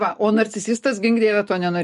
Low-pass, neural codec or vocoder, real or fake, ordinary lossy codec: 14.4 kHz; none; real; MP3, 48 kbps